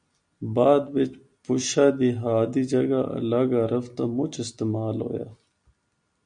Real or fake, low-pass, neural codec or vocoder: real; 9.9 kHz; none